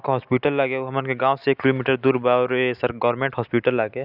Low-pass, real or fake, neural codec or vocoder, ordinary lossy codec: 5.4 kHz; real; none; none